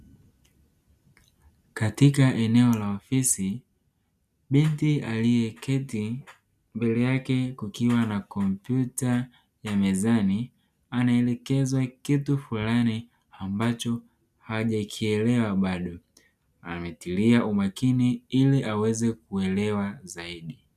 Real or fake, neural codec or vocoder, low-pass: real; none; 14.4 kHz